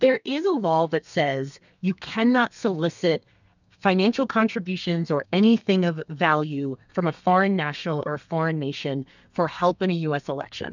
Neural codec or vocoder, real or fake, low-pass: codec, 32 kHz, 1.9 kbps, SNAC; fake; 7.2 kHz